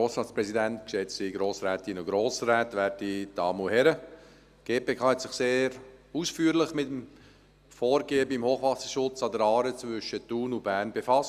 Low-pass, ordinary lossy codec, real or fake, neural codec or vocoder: 14.4 kHz; Opus, 64 kbps; real; none